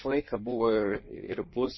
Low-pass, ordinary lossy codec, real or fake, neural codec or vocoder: 7.2 kHz; MP3, 24 kbps; fake; codec, 16 kHz in and 24 kHz out, 1.1 kbps, FireRedTTS-2 codec